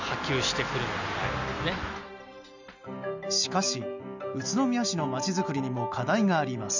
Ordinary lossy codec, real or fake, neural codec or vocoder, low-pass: none; real; none; 7.2 kHz